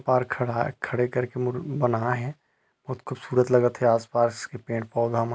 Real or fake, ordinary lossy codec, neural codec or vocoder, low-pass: real; none; none; none